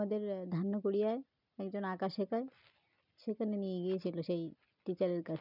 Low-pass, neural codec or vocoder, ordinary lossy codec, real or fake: 5.4 kHz; none; none; real